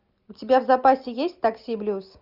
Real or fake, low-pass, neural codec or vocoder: real; 5.4 kHz; none